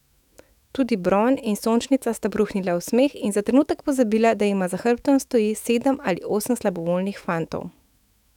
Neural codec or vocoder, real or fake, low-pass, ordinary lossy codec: autoencoder, 48 kHz, 128 numbers a frame, DAC-VAE, trained on Japanese speech; fake; 19.8 kHz; none